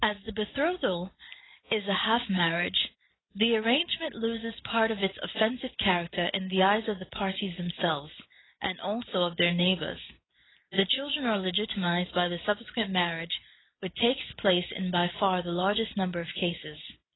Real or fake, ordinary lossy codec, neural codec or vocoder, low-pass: real; AAC, 16 kbps; none; 7.2 kHz